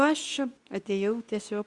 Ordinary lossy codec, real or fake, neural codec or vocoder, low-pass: Opus, 64 kbps; fake; codec, 24 kHz, 0.9 kbps, WavTokenizer, medium speech release version 2; 10.8 kHz